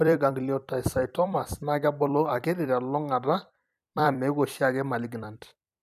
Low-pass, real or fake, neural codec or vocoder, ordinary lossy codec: 14.4 kHz; fake; vocoder, 44.1 kHz, 128 mel bands every 256 samples, BigVGAN v2; none